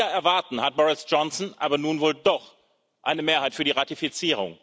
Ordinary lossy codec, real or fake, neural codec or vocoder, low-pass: none; real; none; none